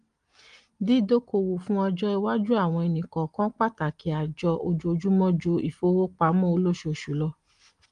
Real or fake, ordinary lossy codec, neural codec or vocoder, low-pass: real; Opus, 24 kbps; none; 9.9 kHz